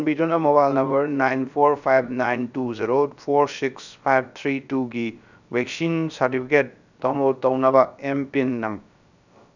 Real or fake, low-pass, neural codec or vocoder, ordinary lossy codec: fake; 7.2 kHz; codec, 16 kHz, about 1 kbps, DyCAST, with the encoder's durations; none